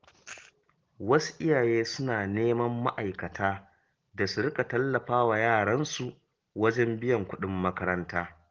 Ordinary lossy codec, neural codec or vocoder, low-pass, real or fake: Opus, 16 kbps; none; 7.2 kHz; real